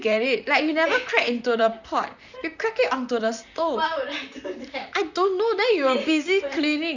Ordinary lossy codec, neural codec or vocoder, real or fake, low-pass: none; vocoder, 44.1 kHz, 128 mel bands, Pupu-Vocoder; fake; 7.2 kHz